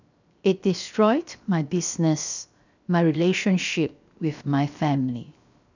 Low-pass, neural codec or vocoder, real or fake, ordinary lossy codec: 7.2 kHz; codec, 16 kHz, 0.7 kbps, FocalCodec; fake; none